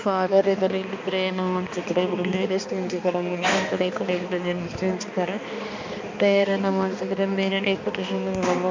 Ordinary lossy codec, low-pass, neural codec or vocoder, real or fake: MP3, 48 kbps; 7.2 kHz; codec, 16 kHz, 2 kbps, X-Codec, HuBERT features, trained on balanced general audio; fake